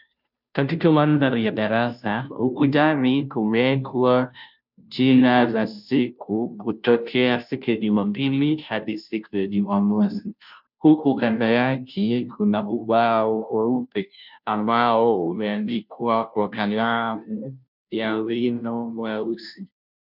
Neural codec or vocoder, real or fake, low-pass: codec, 16 kHz, 0.5 kbps, FunCodec, trained on Chinese and English, 25 frames a second; fake; 5.4 kHz